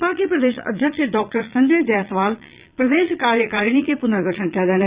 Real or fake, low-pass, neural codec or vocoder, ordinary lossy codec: fake; 3.6 kHz; vocoder, 22.05 kHz, 80 mel bands, Vocos; Opus, 64 kbps